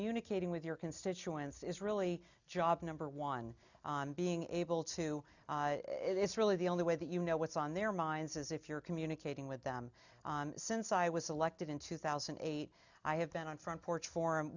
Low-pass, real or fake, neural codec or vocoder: 7.2 kHz; real; none